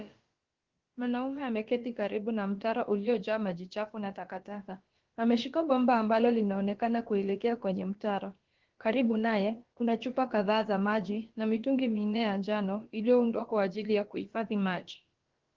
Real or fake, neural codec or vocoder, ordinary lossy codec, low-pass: fake; codec, 16 kHz, about 1 kbps, DyCAST, with the encoder's durations; Opus, 16 kbps; 7.2 kHz